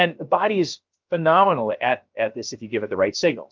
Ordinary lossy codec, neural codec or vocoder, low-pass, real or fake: Opus, 32 kbps; codec, 16 kHz, about 1 kbps, DyCAST, with the encoder's durations; 7.2 kHz; fake